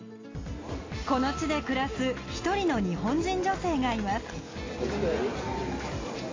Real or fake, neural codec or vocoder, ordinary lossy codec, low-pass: real; none; AAC, 32 kbps; 7.2 kHz